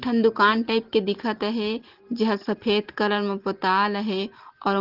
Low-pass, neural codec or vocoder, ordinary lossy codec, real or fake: 5.4 kHz; none; Opus, 16 kbps; real